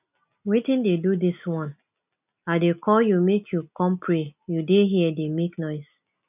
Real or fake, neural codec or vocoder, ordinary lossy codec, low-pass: real; none; none; 3.6 kHz